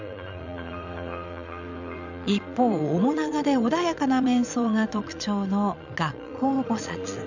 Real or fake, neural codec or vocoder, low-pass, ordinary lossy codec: fake; vocoder, 22.05 kHz, 80 mel bands, Vocos; 7.2 kHz; none